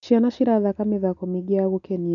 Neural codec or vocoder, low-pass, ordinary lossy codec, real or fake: none; 7.2 kHz; none; real